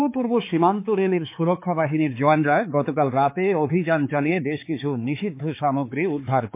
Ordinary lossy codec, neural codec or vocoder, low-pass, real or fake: MP3, 24 kbps; codec, 16 kHz, 4 kbps, X-Codec, HuBERT features, trained on balanced general audio; 3.6 kHz; fake